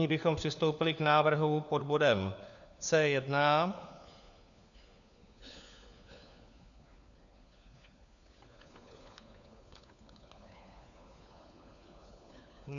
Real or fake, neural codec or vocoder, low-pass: fake; codec, 16 kHz, 4 kbps, FunCodec, trained on LibriTTS, 50 frames a second; 7.2 kHz